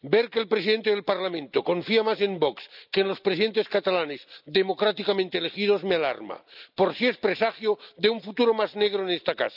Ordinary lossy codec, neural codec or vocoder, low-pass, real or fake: none; none; 5.4 kHz; real